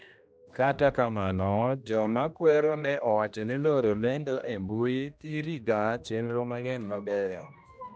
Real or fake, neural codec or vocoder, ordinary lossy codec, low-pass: fake; codec, 16 kHz, 1 kbps, X-Codec, HuBERT features, trained on general audio; none; none